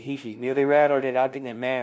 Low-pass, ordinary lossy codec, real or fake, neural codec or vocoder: none; none; fake; codec, 16 kHz, 0.5 kbps, FunCodec, trained on LibriTTS, 25 frames a second